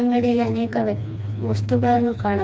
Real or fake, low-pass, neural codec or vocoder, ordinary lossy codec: fake; none; codec, 16 kHz, 2 kbps, FreqCodec, smaller model; none